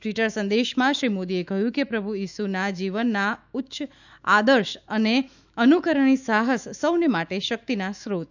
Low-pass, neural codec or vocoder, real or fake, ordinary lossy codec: 7.2 kHz; autoencoder, 48 kHz, 128 numbers a frame, DAC-VAE, trained on Japanese speech; fake; none